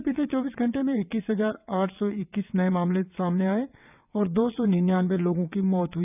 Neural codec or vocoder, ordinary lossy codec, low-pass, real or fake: vocoder, 22.05 kHz, 80 mel bands, WaveNeXt; none; 3.6 kHz; fake